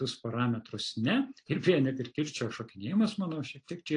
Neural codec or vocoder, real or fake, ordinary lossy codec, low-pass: none; real; AAC, 48 kbps; 9.9 kHz